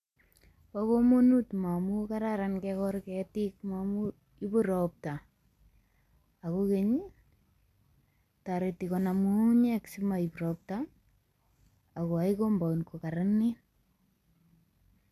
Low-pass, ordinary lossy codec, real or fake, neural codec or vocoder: 14.4 kHz; none; real; none